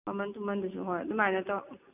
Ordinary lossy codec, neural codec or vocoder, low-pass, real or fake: none; none; 3.6 kHz; real